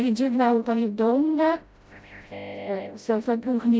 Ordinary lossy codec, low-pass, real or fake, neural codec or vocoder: none; none; fake; codec, 16 kHz, 0.5 kbps, FreqCodec, smaller model